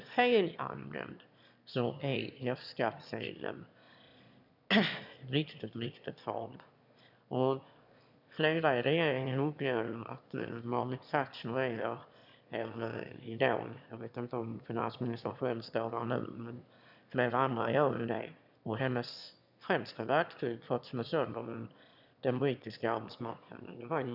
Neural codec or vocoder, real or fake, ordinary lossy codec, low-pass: autoencoder, 22.05 kHz, a latent of 192 numbers a frame, VITS, trained on one speaker; fake; none; 5.4 kHz